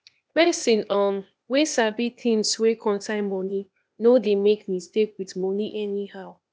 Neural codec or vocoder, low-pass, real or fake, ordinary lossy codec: codec, 16 kHz, 0.8 kbps, ZipCodec; none; fake; none